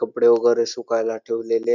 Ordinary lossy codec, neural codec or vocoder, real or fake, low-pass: none; none; real; 7.2 kHz